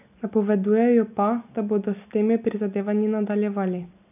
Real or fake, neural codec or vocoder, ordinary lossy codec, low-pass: real; none; none; 3.6 kHz